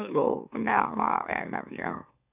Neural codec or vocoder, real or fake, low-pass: autoencoder, 44.1 kHz, a latent of 192 numbers a frame, MeloTTS; fake; 3.6 kHz